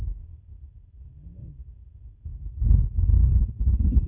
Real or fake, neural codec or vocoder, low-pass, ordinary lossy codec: fake; codec, 16 kHz, 8 kbps, FunCodec, trained on Chinese and English, 25 frames a second; 3.6 kHz; none